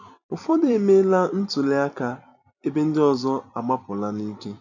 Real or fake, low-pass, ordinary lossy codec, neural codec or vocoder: fake; 7.2 kHz; none; vocoder, 44.1 kHz, 128 mel bands every 256 samples, BigVGAN v2